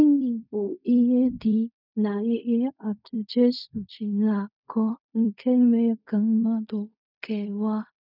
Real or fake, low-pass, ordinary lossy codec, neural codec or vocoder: fake; 5.4 kHz; none; codec, 16 kHz in and 24 kHz out, 0.4 kbps, LongCat-Audio-Codec, fine tuned four codebook decoder